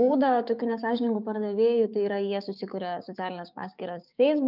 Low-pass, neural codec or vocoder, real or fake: 5.4 kHz; codec, 16 kHz, 6 kbps, DAC; fake